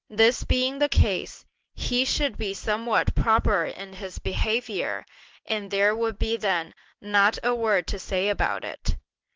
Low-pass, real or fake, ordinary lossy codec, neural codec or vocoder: 7.2 kHz; real; Opus, 16 kbps; none